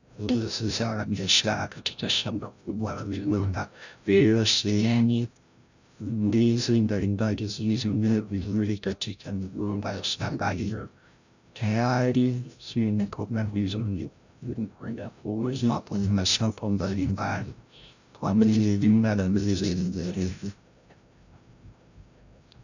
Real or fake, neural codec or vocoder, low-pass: fake; codec, 16 kHz, 0.5 kbps, FreqCodec, larger model; 7.2 kHz